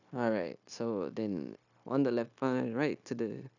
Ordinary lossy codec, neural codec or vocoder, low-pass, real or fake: none; codec, 16 kHz, 0.9 kbps, LongCat-Audio-Codec; 7.2 kHz; fake